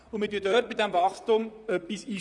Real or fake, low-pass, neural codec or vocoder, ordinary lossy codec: fake; 10.8 kHz; vocoder, 44.1 kHz, 128 mel bands, Pupu-Vocoder; none